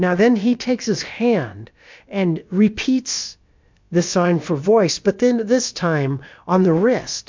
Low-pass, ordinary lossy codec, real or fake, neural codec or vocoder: 7.2 kHz; MP3, 64 kbps; fake; codec, 16 kHz, about 1 kbps, DyCAST, with the encoder's durations